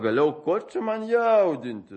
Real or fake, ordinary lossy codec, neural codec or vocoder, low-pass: real; MP3, 32 kbps; none; 10.8 kHz